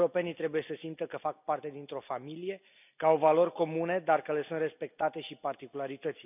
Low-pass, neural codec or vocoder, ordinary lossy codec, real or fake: 3.6 kHz; none; none; real